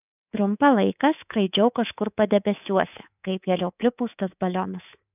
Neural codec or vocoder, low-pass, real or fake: codec, 24 kHz, 3.1 kbps, DualCodec; 3.6 kHz; fake